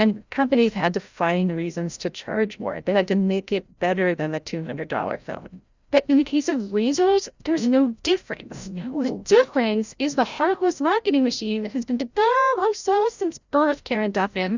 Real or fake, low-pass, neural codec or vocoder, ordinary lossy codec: fake; 7.2 kHz; codec, 16 kHz, 0.5 kbps, FreqCodec, larger model; Opus, 64 kbps